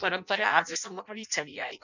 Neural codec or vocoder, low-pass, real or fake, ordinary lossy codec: codec, 16 kHz in and 24 kHz out, 0.6 kbps, FireRedTTS-2 codec; 7.2 kHz; fake; none